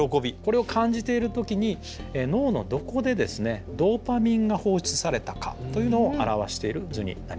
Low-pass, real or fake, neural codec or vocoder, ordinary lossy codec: none; real; none; none